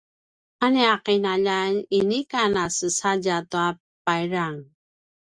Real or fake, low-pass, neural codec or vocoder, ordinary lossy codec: real; 9.9 kHz; none; Opus, 64 kbps